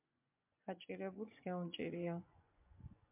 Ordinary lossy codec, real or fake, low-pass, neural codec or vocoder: MP3, 32 kbps; fake; 3.6 kHz; vocoder, 24 kHz, 100 mel bands, Vocos